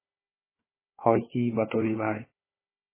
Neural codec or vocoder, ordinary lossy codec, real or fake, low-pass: codec, 16 kHz, 4 kbps, FunCodec, trained on Chinese and English, 50 frames a second; MP3, 16 kbps; fake; 3.6 kHz